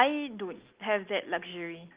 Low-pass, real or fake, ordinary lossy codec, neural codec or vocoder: 3.6 kHz; real; Opus, 32 kbps; none